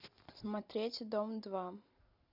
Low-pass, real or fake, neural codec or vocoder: 5.4 kHz; real; none